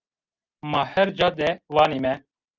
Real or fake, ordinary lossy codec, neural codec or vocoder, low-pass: real; Opus, 32 kbps; none; 7.2 kHz